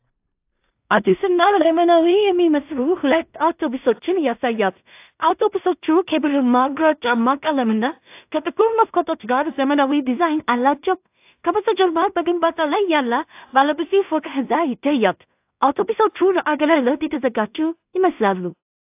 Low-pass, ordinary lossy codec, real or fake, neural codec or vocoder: 3.6 kHz; AAC, 32 kbps; fake; codec, 16 kHz in and 24 kHz out, 0.4 kbps, LongCat-Audio-Codec, two codebook decoder